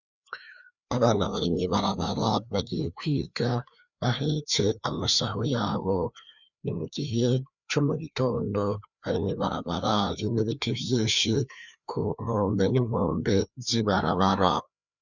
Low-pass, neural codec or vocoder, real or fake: 7.2 kHz; codec, 16 kHz, 2 kbps, FreqCodec, larger model; fake